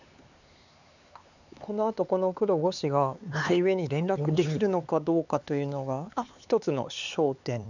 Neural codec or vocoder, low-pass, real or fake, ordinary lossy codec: codec, 16 kHz, 4 kbps, X-Codec, HuBERT features, trained on LibriSpeech; 7.2 kHz; fake; none